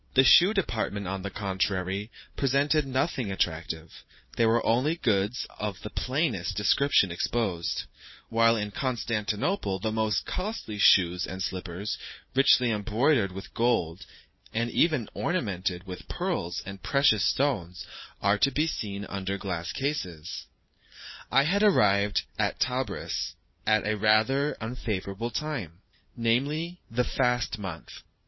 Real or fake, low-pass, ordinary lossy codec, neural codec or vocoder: fake; 7.2 kHz; MP3, 24 kbps; vocoder, 44.1 kHz, 128 mel bands every 512 samples, BigVGAN v2